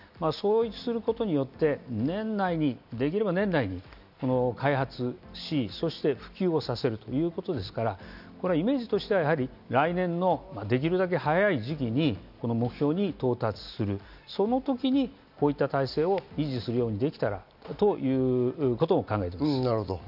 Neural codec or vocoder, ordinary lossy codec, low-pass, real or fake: none; none; 5.4 kHz; real